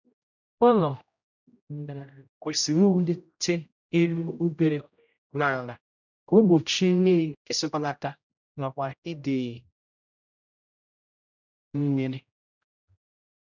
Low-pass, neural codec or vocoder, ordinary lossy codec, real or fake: 7.2 kHz; codec, 16 kHz, 0.5 kbps, X-Codec, HuBERT features, trained on general audio; none; fake